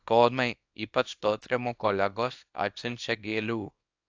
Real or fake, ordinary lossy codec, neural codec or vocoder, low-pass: fake; AAC, 48 kbps; codec, 24 kHz, 0.9 kbps, WavTokenizer, small release; 7.2 kHz